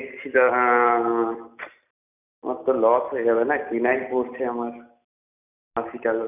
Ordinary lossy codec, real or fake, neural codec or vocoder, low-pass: none; real; none; 3.6 kHz